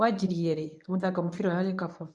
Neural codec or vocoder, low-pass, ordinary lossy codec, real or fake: codec, 24 kHz, 0.9 kbps, WavTokenizer, medium speech release version 1; none; none; fake